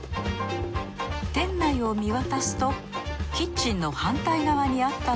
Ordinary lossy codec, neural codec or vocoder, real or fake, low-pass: none; none; real; none